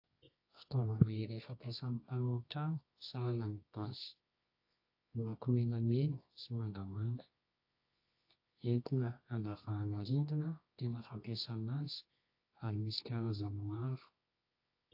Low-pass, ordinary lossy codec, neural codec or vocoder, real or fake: 5.4 kHz; AAC, 32 kbps; codec, 24 kHz, 0.9 kbps, WavTokenizer, medium music audio release; fake